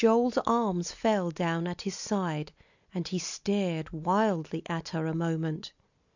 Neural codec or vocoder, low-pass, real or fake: none; 7.2 kHz; real